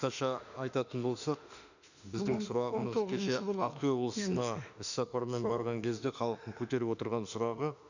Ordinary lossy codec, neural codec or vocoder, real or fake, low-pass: none; autoencoder, 48 kHz, 32 numbers a frame, DAC-VAE, trained on Japanese speech; fake; 7.2 kHz